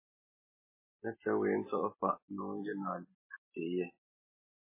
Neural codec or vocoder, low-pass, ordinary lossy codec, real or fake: none; 3.6 kHz; MP3, 16 kbps; real